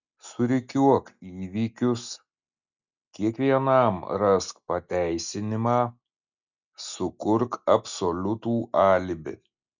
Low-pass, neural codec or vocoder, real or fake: 7.2 kHz; none; real